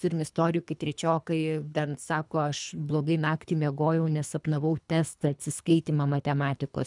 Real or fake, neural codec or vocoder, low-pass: fake; codec, 24 kHz, 3 kbps, HILCodec; 10.8 kHz